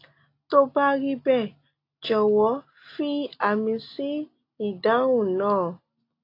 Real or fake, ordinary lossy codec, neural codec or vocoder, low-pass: real; AAC, 32 kbps; none; 5.4 kHz